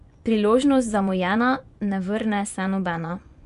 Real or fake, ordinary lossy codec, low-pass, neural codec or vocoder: fake; none; 10.8 kHz; vocoder, 24 kHz, 100 mel bands, Vocos